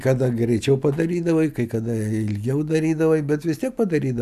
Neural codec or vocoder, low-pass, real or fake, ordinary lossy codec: none; 14.4 kHz; real; AAC, 96 kbps